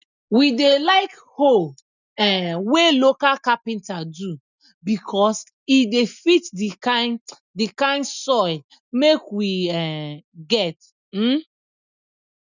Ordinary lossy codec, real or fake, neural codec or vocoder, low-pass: none; real; none; 7.2 kHz